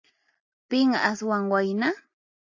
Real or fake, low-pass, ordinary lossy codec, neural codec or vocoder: real; 7.2 kHz; AAC, 48 kbps; none